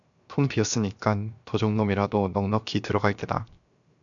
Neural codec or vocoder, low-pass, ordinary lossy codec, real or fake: codec, 16 kHz, 0.7 kbps, FocalCodec; 7.2 kHz; MP3, 96 kbps; fake